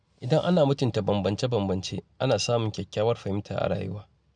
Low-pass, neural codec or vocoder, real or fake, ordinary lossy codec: 9.9 kHz; none; real; none